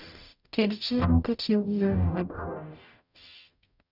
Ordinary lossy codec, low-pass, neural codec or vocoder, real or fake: none; 5.4 kHz; codec, 44.1 kHz, 0.9 kbps, DAC; fake